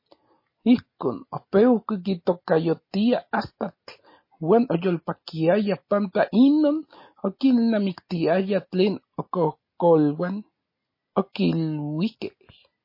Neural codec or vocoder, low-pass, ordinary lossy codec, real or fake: none; 5.4 kHz; MP3, 24 kbps; real